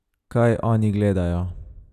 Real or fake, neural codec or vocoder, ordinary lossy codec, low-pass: real; none; none; 14.4 kHz